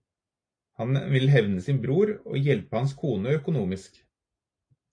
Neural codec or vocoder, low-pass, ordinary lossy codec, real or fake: none; 9.9 kHz; AAC, 48 kbps; real